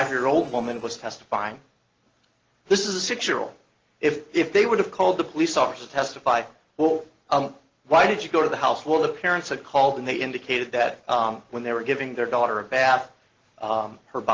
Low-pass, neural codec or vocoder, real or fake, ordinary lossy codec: 7.2 kHz; none; real; Opus, 16 kbps